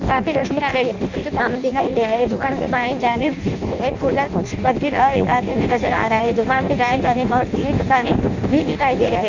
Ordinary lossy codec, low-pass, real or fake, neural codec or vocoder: none; 7.2 kHz; fake; codec, 16 kHz in and 24 kHz out, 0.6 kbps, FireRedTTS-2 codec